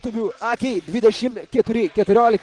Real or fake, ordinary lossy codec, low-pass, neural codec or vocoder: fake; Opus, 24 kbps; 10.8 kHz; vocoder, 44.1 kHz, 128 mel bands, Pupu-Vocoder